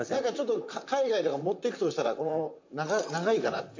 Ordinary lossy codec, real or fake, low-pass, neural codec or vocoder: MP3, 48 kbps; fake; 7.2 kHz; vocoder, 44.1 kHz, 128 mel bands, Pupu-Vocoder